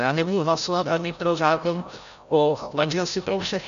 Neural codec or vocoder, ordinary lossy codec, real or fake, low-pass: codec, 16 kHz, 0.5 kbps, FreqCodec, larger model; AAC, 96 kbps; fake; 7.2 kHz